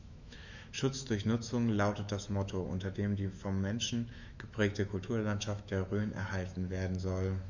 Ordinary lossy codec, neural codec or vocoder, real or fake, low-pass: none; autoencoder, 48 kHz, 128 numbers a frame, DAC-VAE, trained on Japanese speech; fake; 7.2 kHz